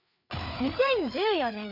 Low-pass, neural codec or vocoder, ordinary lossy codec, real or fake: 5.4 kHz; codec, 16 kHz, 4 kbps, FreqCodec, larger model; none; fake